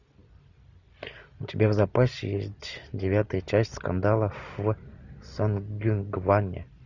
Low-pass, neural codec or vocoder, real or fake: 7.2 kHz; none; real